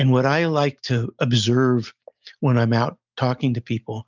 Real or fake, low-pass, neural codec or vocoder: real; 7.2 kHz; none